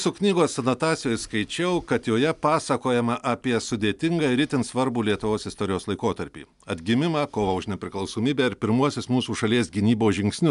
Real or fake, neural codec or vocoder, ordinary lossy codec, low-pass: real; none; MP3, 96 kbps; 10.8 kHz